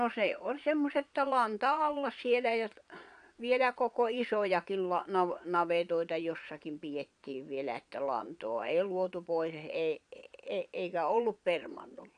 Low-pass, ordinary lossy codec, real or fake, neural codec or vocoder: 9.9 kHz; none; fake; vocoder, 22.05 kHz, 80 mel bands, Vocos